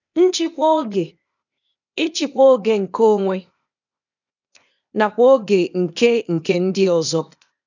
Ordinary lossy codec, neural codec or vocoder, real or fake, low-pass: none; codec, 16 kHz, 0.8 kbps, ZipCodec; fake; 7.2 kHz